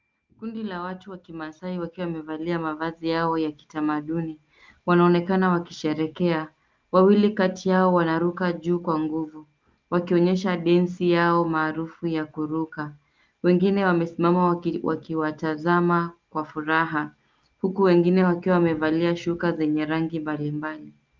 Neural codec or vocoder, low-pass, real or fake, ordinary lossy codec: none; 7.2 kHz; real; Opus, 24 kbps